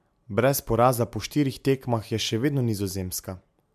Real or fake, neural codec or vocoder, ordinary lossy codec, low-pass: real; none; MP3, 96 kbps; 14.4 kHz